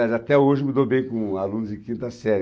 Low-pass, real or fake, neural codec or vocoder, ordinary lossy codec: none; real; none; none